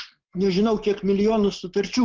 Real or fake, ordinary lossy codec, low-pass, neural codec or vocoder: real; Opus, 16 kbps; 7.2 kHz; none